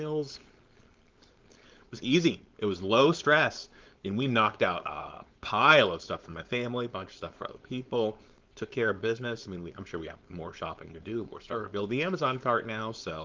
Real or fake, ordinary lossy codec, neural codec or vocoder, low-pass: fake; Opus, 24 kbps; codec, 16 kHz, 4.8 kbps, FACodec; 7.2 kHz